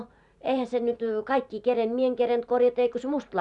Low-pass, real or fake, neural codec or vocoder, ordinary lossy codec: 10.8 kHz; fake; vocoder, 44.1 kHz, 128 mel bands every 512 samples, BigVGAN v2; none